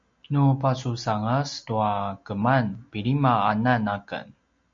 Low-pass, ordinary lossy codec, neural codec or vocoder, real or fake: 7.2 kHz; MP3, 48 kbps; none; real